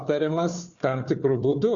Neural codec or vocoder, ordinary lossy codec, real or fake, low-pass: codec, 16 kHz, 4 kbps, FunCodec, trained on Chinese and English, 50 frames a second; Opus, 64 kbps; fake; 7.2 kHz